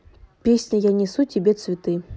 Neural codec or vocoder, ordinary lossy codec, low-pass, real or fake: none; none; none; real